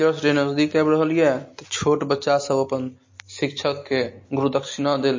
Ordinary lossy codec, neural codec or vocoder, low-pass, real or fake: MP3, 32 kbps; none; 7.2 kHz; real